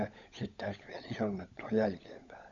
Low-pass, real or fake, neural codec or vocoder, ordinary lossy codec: 7.2 kHz; fake; codec, 16 kHz, 16 kbps, FunCodec, trained on LibriTTS, 50 frames a second; AAC, 64 kbps